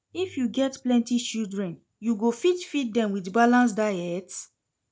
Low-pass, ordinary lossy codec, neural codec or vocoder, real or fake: none; none; none; real